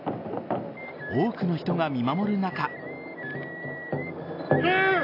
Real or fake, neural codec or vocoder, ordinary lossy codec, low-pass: real; none; AAC, 32 kbps; 5.4 kHz